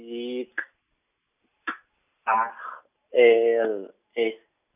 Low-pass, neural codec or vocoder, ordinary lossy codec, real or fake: 3.6 kHz; none; none; real